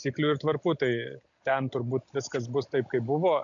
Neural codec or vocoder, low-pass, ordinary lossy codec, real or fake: none; 7.2 kHz; AAC, 64 kbps; real